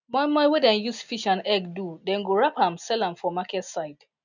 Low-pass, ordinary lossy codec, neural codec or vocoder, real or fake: 7.2 kHz; none; none; real